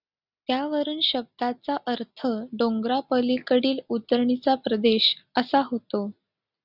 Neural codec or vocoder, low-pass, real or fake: none; 5.4 kHz; real